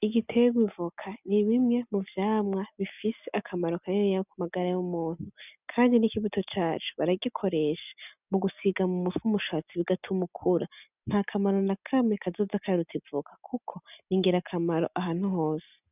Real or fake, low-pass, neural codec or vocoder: real; 3.6 kHz; none